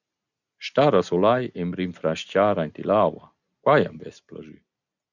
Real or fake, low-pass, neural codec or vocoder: real; 7.2 kHz; none